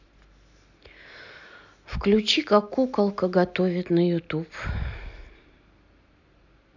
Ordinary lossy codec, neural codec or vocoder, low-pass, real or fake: none; none; 7.2 kHz; real